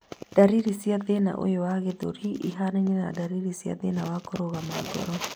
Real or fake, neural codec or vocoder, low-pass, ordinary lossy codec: real; none; none; none